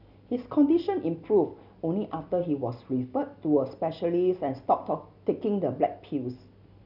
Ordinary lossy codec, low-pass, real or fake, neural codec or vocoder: none; 5.4 kHz; real; none